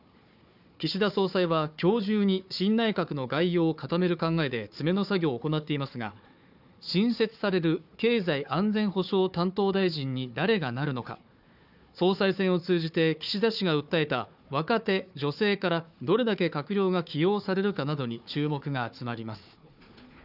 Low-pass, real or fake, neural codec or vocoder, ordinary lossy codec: 5.4 kHz; fake; codec, 16 kHz, 4 kbps, FunCodec, trained on Chinese and English, 50 frames a second; none